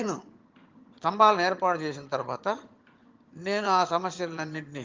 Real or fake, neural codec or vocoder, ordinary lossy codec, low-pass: fake; vocoder, 22.05 kHz, 80 mel bands, HiFi-GAN; Opus, 32 kbps; 7.2 kHz